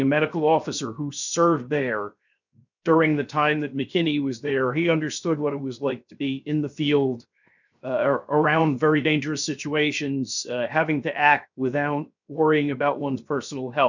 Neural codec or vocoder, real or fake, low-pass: codec, 16 kHz, 0.7 kbps, FocalCodec; fake; 7.2 kHz